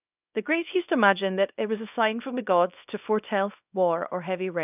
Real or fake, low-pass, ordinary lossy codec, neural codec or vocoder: fake; 3.6 kHz; none; codec, 24 kHz, 0.9 kbps, WavTokenizer, small release